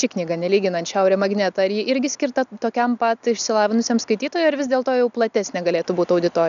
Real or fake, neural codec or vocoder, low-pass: real; none; 7.2 kHz